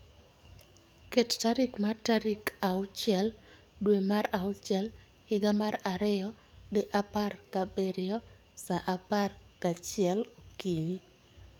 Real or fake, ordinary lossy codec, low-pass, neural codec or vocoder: fake; none; 19.8 kHz; codec, 44.1 kHz, 7.8 kbps, DAC